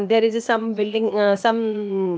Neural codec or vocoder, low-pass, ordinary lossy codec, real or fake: codec, 16 kHz, 0.8 kbps, ZipCodec; none; none; fake